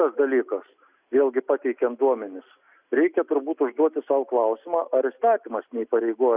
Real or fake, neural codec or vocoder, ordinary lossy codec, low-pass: real; none; Opus, 64 kbps; 3.6 kHz